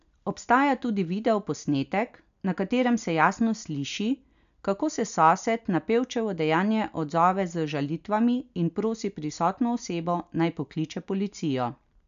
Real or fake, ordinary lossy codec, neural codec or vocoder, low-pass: real; AAC, 96 kbps; none; 7.2 kHz